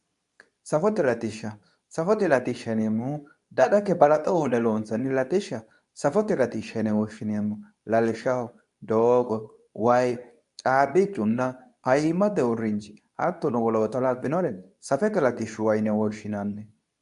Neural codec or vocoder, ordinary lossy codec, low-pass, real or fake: codec, 24 kHz, 0.9 kbps, WavTokenizer, medium speech release version 2; AAC, 96 kbps; 10.8 kHz; fake